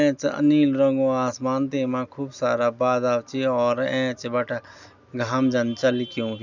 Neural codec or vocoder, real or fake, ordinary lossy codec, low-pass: none; real; none; 7.2 kHz